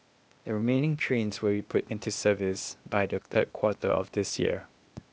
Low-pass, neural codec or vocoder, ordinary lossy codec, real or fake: none; codec, 16 kHz, 0.8 kbps, ZipCodec; none; fake